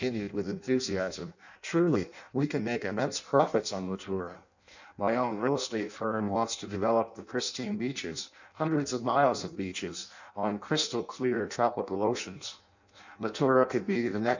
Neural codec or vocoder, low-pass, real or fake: codec, 16 kHz in and 24 kHz out, 0.6 kbps, FireRedTTS-2 codec; 7.2 kHz; fake